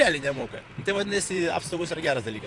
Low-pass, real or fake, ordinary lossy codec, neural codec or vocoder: 10.8 kHz; fake; AAC, 48 kbps; vocoder, 44.1 kHz, 128 mel bands, Pupu-Vocoder